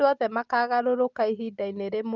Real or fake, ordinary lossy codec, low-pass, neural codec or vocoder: real; Opus, 32 kbps; 7.2 kHz; none